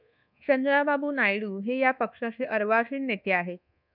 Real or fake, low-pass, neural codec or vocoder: fake; 5.4 kHz; codec, 24 kHz, 1.2 kbps, DualCodec